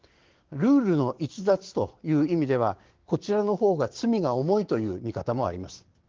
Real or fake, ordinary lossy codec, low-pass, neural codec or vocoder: fake; Opus, 16 kbps; 7.2 kHz; codec, 16 kHz, 6 kbps, DAC